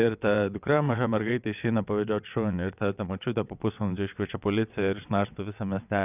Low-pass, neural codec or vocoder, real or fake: 3.6 kHz; vocoder, 22.05 kHz, 80 mel bands, WaveNeXt; fake